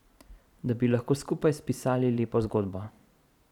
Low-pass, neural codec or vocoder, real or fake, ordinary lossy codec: 19.8 kHz; vocoder, 44.1 kHz, 128 mel bands every 512 samples, BigVGAN v2; fake; none